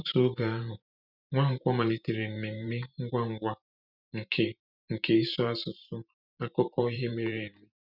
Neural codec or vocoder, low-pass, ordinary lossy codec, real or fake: vocoder, 44.1 kHz, 128 mel bands every 512 samples, BigVGAN v2; 5.4 kHz; none; fake